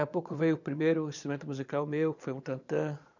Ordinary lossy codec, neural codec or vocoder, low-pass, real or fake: none; vocoder, 22.05 kHz, 80 mel bands, Vocos; 7.2 kHz; fake